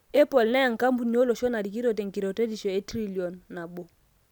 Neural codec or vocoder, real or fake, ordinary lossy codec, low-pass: none; real; none; 19.8 kHz